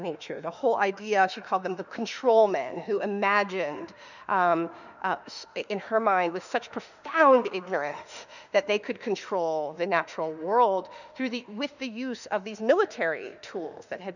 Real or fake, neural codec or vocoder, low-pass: fake; autoencoder, 48 kHz, 32 numbers a frame, DAC-VAE, trained on Japanese speech; 7.2 kHz